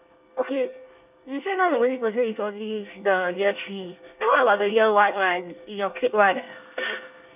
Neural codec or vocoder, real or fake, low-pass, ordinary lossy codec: codec, 24 kHz, 1 kbps, SNAC; fake; 3.6 kHz; none